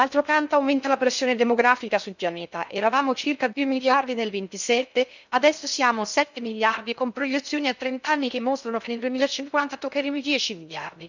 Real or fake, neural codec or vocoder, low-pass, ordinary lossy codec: fake; codec, 16 kHz in and 24 kHz out, 0.6 kbps, FocalCodec, streaming, 2048 codes; 7.2 kHz; none